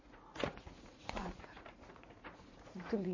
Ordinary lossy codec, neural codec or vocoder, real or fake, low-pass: MP3, 32 kbps; vocoder, 44.1 kHz, 80 mel bands, Vocos; fake; 7.2 kHz